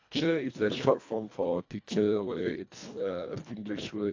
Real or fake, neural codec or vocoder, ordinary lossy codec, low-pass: fake; codec, 24 kHz, 1.5 kbps, HILCodec; AAC, 48 kbps; 7.2 kHz